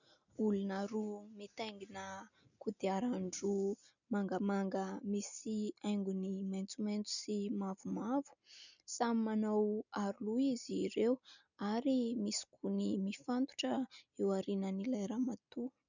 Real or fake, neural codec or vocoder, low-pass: real; none; 7.2 kHz